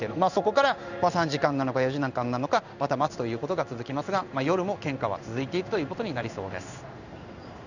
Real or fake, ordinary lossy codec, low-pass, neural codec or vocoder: fake; none; 7.2 kHz; codec, 16 kHz in and 24 kHz out, 1 kbps, XY-Tokenizer